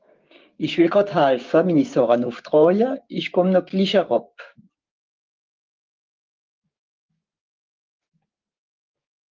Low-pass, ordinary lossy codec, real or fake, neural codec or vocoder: 7.2 kHz; Opus, 32 kbps; fake; codec, 16 kHz, 6 kbps, DAC